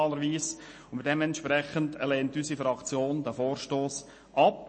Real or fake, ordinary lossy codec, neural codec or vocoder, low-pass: real; MP3, 32 kbps; none; 9.9 kHz